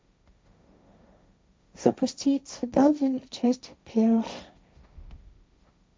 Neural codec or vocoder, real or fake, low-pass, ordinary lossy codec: codec, 16 kHz, 1.1 kbps, Voila-Tokenizer; fake; none; none